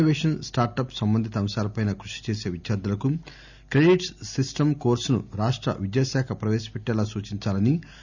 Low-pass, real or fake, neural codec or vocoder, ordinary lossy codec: 7.2 kHz; real; none; none